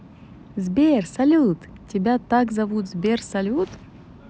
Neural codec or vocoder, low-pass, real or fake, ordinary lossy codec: none; none; real; none